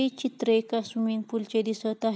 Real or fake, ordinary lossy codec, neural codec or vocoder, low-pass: real; none; none; none